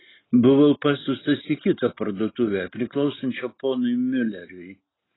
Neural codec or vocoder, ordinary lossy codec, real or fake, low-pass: none; AAC, 16 kbps; real; 7.2 kHz